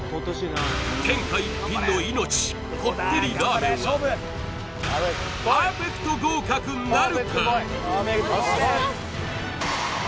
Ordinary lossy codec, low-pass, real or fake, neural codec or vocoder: none; none; real; none